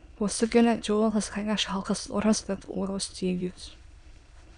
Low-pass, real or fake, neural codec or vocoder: 9.9 kHz; fake; autoencoder, 22.05 kHz, a latent of 192 numbers a frame, VITS, trained on many speakers